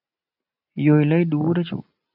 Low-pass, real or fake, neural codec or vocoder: 5.4 kHz; real; none